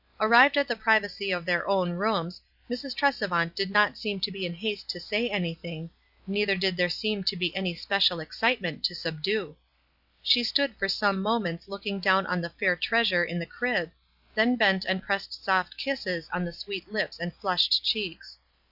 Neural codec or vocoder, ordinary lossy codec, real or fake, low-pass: none; Opus, 64 kbps; real; 5.4 kHz